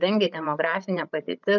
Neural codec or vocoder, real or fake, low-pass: codec, 16 kHz, 16 kbps, FreqCodec, larger model; fake; 7.2 kHz